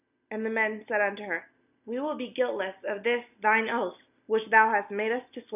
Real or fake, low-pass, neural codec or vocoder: real; 3.6 kHz; none